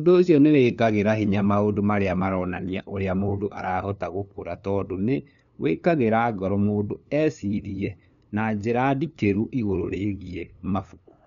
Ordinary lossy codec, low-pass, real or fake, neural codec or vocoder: none; 7.2 kHz; fake; codec, 16 kHz, 2 kbps, FunCodec, trained on LibriTTS, 25 frames a second